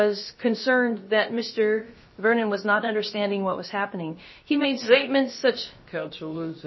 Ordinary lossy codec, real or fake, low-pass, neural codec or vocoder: MP3, 24 kbps; fake; 7.2 kHz; codec, 16 kHz, about 1 kbps, DyCAST, with the encoder's durations